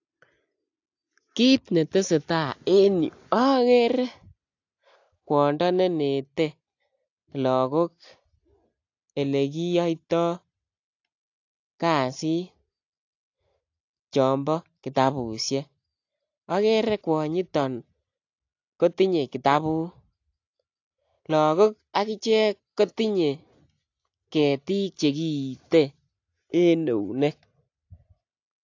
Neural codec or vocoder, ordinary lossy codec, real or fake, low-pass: none; AAC, 48 kbps; real; 7.2 kHz